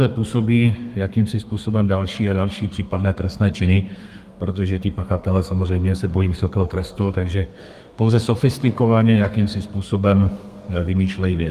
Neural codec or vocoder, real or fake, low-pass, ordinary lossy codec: codec, 32 kHz, 1.9 kbps, SNAC; fake; 14.4 kHz; Opus, 32 kbps